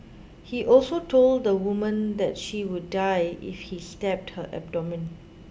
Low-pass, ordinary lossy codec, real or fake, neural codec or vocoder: none; none; real; none